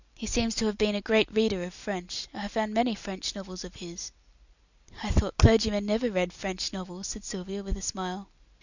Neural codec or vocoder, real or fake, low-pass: none; real; 7.2 kHz